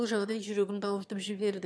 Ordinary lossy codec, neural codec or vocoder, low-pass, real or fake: none; autoencoder, 22.05 kHz, a latent of 192 numbers a frame, VITS, trained on one speaker; none; fake